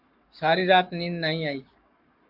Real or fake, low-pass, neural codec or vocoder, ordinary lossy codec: fake; 5.4 kHz; codec, 44.1 kHz, 7.8 kbps, Pupu-Codec; MP3, 48 kbps